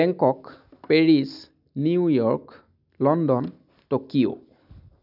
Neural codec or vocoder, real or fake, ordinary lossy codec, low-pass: none; real; none; 5.4 kHz